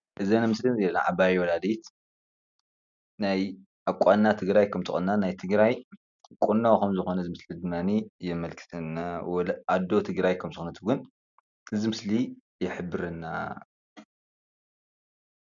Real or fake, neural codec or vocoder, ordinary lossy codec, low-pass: real; none; MP3, 96 kbps; 7.2 kHz